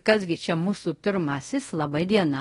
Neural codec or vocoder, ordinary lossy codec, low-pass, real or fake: codec, 24 kHz, 0.5 kbps, DualCodec; AAC, 32 kbps; 10.8 kHz; fake